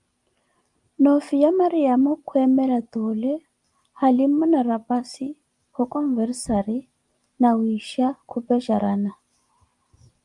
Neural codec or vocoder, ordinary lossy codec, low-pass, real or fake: none; Opus, 32 kbps; 10.8 kHz; real